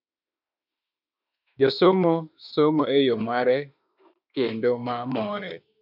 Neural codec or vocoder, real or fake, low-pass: autoencoder, 48 kHz, 32 numbers a frame, DAC-VAE, trained on Japanese speech; fake; 5.4 kHz